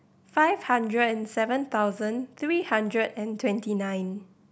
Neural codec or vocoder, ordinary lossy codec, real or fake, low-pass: none; none; real; none